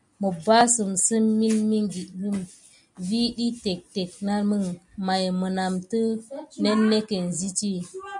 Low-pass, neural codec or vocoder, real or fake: 10.8 kHz; none; real